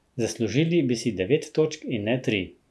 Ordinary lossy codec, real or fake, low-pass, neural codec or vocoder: none; real; none; none